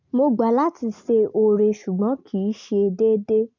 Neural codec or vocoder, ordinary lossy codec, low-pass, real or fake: none; none; 7.2 kHz; real